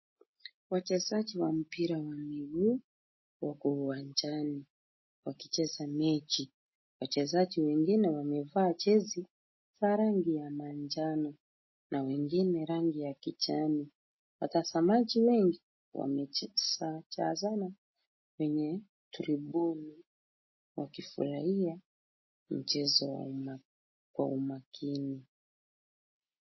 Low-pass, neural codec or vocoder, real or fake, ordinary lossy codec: 7.2 kHz; none; real; MP3, 24 kbps